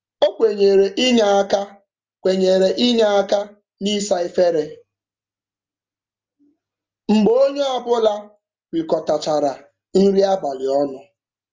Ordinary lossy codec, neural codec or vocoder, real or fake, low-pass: Opus, 32 kbps; none; real; 7.2 kHz